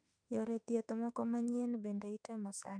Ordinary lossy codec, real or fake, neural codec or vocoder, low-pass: none; fake; autoencoder, 48 kHz, 32 numbers a frame, DAC-VAE, trained on Japanese speech; 9.9 kHz